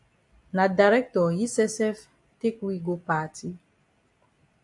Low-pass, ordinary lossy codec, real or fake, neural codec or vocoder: 10.8 kHz; AAC, 64 kbps; real; none